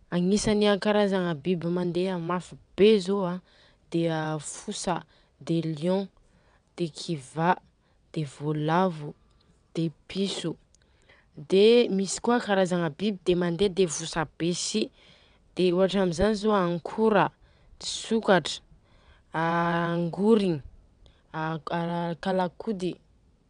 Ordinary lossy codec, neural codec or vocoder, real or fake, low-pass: none; vocoder, 22.05 kHz, 80 mel bands, WaveNeXt; fake; 9.9 kHz